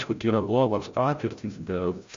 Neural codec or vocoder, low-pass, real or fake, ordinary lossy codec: codec, 16 kHz, 0.5 kbps, FreqCodec, larger model; 7.2 kHz; fake; AAC, 48 kbps